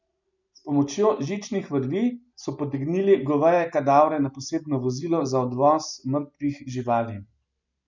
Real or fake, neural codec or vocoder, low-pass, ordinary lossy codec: real; none; 7.2 kHz; none